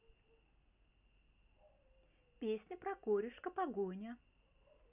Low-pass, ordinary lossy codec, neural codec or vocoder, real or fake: 3.6 kHz; none; none; real